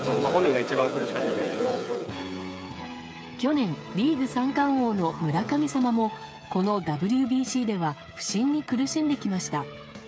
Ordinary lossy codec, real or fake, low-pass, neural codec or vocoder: none; fake; none; codec, 16 kHz, 8 kbps, FreqCodec, smaller model